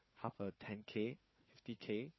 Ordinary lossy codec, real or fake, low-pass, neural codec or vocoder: MP3, 24 kbps; fake; 7.2 kHz; codec, 16 kHz, 4 kbps, FunCodec, trained on Chinese and English, 50 frames a second